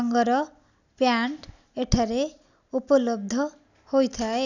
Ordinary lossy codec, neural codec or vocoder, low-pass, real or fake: none; none; 7.2 kHz; real